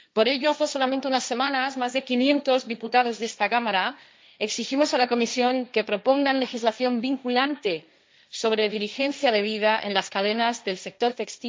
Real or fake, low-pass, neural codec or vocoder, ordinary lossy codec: fake; none; codec, 16 kHz, 1.1 kbps, Voila-Tokenizer; none